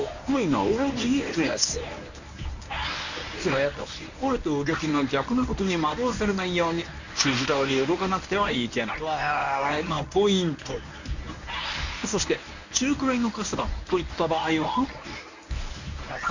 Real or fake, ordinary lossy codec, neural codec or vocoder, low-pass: fake; none; codec, 24 kHz, 0.9 kbps, WavTokenizer, medium speech release version 1; 7.2 kHz